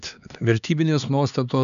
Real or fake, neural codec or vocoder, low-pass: fake; codec, 16 kHz, 2 kbps, X-Codec, HuBERT features, trained on LibriSpeech; 7.2 kHz